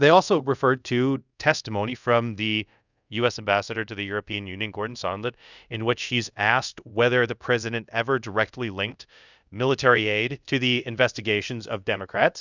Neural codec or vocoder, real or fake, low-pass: codec, 24 kHz, 0.5 kbps, DualCodec; fake; 7.2 kHz